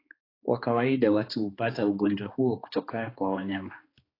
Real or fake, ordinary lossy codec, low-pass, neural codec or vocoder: fake; AAC, 24 kbps; 5.4 kHz; codec, 16 kHz, 2 kbps, X-Codec, HuBERT features, trained on balanced general audio